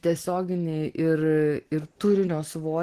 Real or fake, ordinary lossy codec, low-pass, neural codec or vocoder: real; Opus, 16 kbps; 14.4 kHz; none